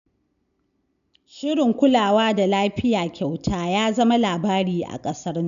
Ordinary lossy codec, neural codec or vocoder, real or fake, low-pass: none; none; real; 7.2 kHz